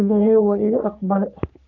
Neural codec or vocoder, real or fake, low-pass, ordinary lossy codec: codec, 44.1 kHz, 3.4 kbps, Pupu-Codec; fake; 7.2 kHz; none